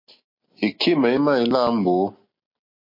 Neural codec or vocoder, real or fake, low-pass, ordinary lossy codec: none; real; 5.4 kHz; MP3, 32 kbps